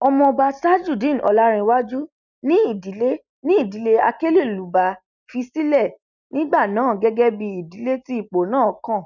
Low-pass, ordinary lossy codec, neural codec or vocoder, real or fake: 7.2 kHz; none; none; real